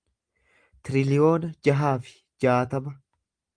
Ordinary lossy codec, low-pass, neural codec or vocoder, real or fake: Opus, 32 kbps; 9.9 kHz; none; real